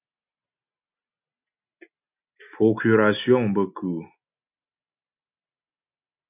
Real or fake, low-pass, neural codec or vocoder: real; 3.6 kHz; none